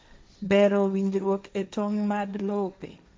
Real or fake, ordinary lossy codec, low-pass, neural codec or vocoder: fake; none; none; codec, 16 kHz, 1.1 kbps, Voila-Tokenizer